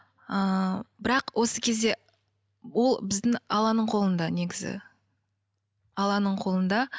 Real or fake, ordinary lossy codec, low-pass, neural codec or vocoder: real; none; none; none